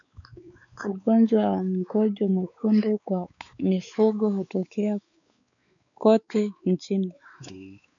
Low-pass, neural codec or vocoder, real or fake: 7.2 kHz; codec, 16 kHz, 4 kbps, X-Codec, WavLM features, trained on Multilingual LibriSpeech; fake